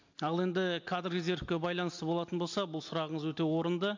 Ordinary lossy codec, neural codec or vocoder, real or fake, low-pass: MP3, 48 kbps; none; real; 7.2 kHz